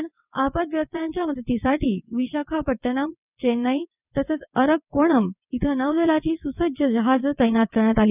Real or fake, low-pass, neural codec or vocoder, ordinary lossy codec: fake; 3.6 kHz; vocoder, 22.05 kHz, 80 mel bands, WaveNeXt; none